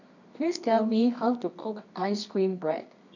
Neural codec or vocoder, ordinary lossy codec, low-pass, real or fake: codec, 24 kHz, 0.9 kbps, WavTokenizer, medium music audio release; none; 7.2 kHz; fake